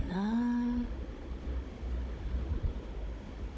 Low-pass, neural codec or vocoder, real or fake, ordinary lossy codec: none; codec, 16 kHz, 16 kbps, FunCodec, trained on Chinese and English, 50 frames a second; fake; none